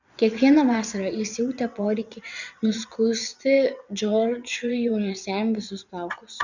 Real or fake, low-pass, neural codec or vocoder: fake; 7.2 kHz; vocoder, 22.05 kHz, 80 mel bands, WaveNeXt